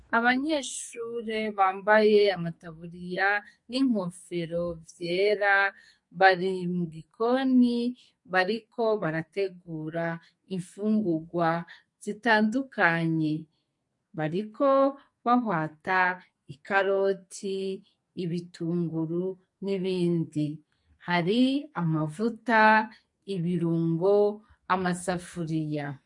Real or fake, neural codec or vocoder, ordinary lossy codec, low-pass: fake; codec, 44.1 kHz, 2.6 kbps, SNAC; MP3, 48 kbps; 10.8 kHz